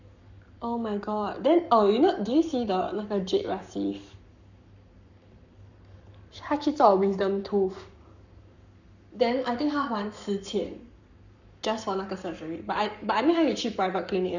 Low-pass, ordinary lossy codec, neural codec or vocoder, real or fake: 7.2 kHz; none; codec, 44.1 kHz, 7.8 kbps, Pupu-Codec; fake